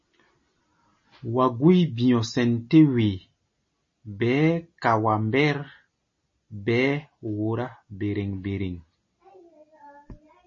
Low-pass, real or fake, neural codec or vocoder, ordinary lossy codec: 7.2 kHz; real; none; MP3, 32 kbps